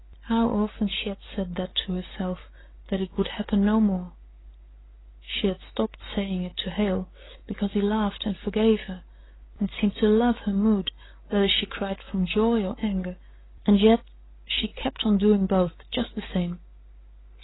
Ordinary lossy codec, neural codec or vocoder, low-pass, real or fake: AAC, 16 kbps; none; 7.2 kHz; real